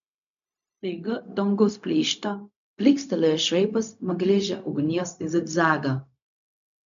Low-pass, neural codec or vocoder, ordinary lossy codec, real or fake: 7.2 kHz; codec, 16 kHz, 0.4 kbps, LongCat-Audio-Codec; MP3, 64 kbps; fake